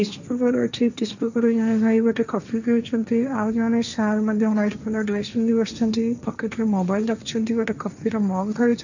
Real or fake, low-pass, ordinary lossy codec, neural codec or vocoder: fake; 7.2 kHz; none; codec, 16 kHz, 1.1 kbps, Voila-Tokenizer